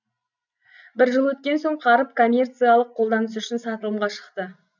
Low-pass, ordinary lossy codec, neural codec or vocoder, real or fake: 7.2 kHz; none; none; real